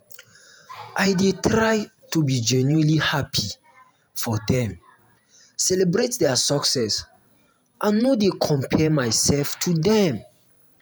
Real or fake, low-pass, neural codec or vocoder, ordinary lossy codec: fake; none; vocoder, 48 kHz, 128 mel bands, Vocos; none